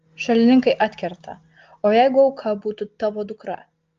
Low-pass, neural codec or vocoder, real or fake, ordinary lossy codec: 7.2 kHz; none; real; Opus, 32 kbps